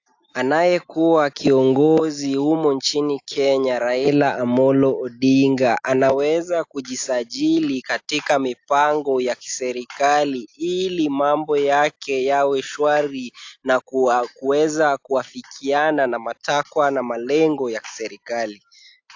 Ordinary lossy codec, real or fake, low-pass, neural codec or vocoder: AAC, 48 kbps; real; 7.2 kHz; none